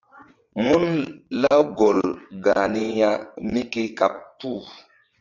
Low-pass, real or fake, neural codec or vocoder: 7.2 kHz; fake; vocoder, 22.05 kHz, 80 mel bands, WaveNeXt